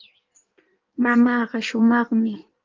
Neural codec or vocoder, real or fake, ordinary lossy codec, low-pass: codec, 16 kHz in and 24 kHz out, 1.1 kbps, FireRedTTS-2 codec; fake; Opus, 32 kbps; 7.2 kHz